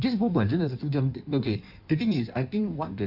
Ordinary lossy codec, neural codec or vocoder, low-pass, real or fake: none; codec, 16 kHz in and 24 kHz out, 1.1 kbps, FireRedTTS-2 codec; 5.4 kHz; fake